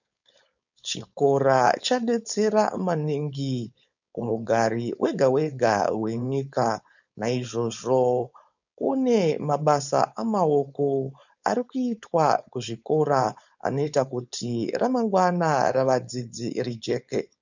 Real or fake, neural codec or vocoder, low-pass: fake; codec, 16 kHz, 4.8 kbps, FACodec; 7.2 kHz